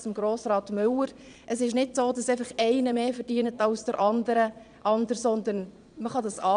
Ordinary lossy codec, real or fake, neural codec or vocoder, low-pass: none; fake; vocoder, 22.05 kHz, 80 mel bands, Vocos; 9.9 kHz